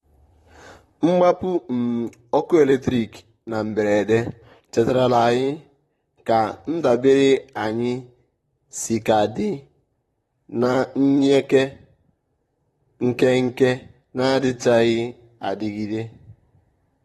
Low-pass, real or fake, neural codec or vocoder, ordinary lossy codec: 19.8 kHz; fake; vocoder, 44.1 kHz, 128 mel bands, Pupu-Vocoder; AAC, 32 kbps